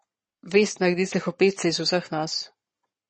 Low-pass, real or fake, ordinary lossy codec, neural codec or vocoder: 10.8 kHz; fake; MP3, 32 kbps; codec, 44.1 kHz, 7.8 kbps, Pupu-Codec